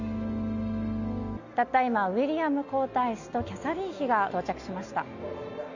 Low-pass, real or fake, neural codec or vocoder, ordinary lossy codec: 7.2 kHz; real; none; none